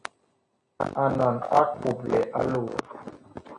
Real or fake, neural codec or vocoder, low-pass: real; none; 9.9 kHz